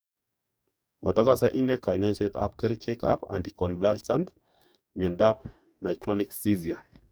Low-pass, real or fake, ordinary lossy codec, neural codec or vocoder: none; fake; none; codec, 44.1 kHz, 2.6 kbps, DAC